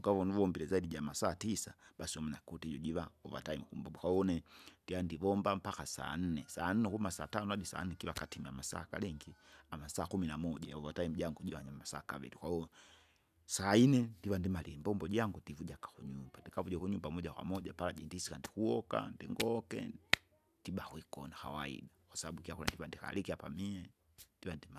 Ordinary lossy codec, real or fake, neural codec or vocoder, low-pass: none; real; none; 14.4 kHz